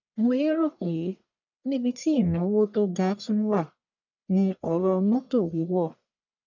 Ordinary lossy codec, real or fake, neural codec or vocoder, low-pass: none; fake; codec, 44.1 kHz, 1.7 kbps, Pupu-Codec; 7.2 kHz